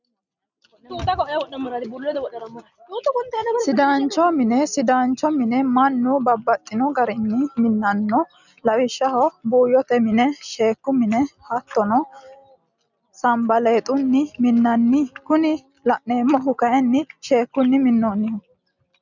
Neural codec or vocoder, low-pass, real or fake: none; 7.2 kHz; real